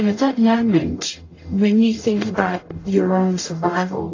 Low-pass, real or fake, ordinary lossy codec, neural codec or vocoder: 7.2 kHz; fake; AAC, 32 kbps; codec, 44.1 kHz, 0.9 kbps, DAC